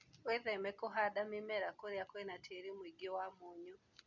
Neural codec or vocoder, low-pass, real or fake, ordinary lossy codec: none; 7.2 kHz; real; none